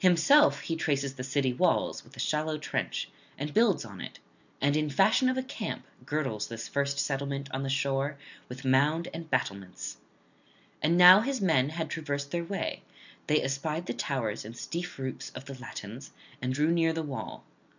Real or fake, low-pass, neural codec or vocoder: real; 7.2 kHz; none